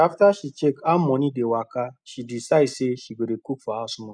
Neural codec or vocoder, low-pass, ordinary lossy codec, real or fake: none; 9.9 kHz; none; real